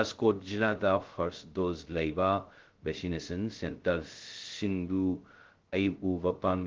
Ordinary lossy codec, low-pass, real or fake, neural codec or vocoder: Opus, 16 kbps; 7.2 kHz; fake; codec, 16 kHz, 0.2 kbps, FocalCodec